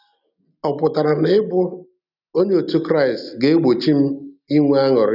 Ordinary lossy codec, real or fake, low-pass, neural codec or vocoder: none; real; 5.4 kHz; none